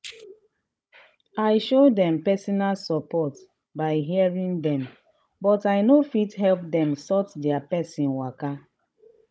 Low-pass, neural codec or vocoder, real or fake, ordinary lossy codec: none; codec, 16 kHz, 16 kbps, FunCodec, trained on Chinese and English, 50 frames a second; fake; none